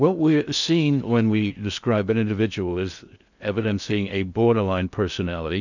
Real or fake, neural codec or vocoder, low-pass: fake; codec, 16 kHz in and 24 kHz out, 0.6 kbps, FocalCodec, streaming, 2048 codes; 7.2 kHz